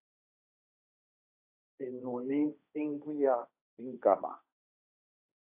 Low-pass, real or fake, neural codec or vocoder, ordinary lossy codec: 3.6 kHz; fake; codec, 16 kHz, 1.1 kbps, Voila-Tokenizer; AAC, 32 kbps